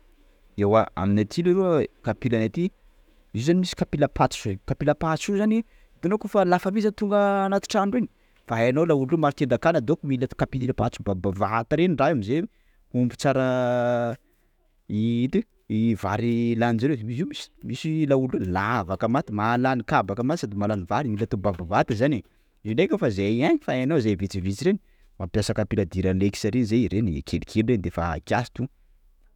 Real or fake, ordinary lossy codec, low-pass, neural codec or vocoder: fake; none; 19.8 kHz; autoencoder, 48 kHz, 128 numbers a frame, DAC-VAE, trained on Japanese speech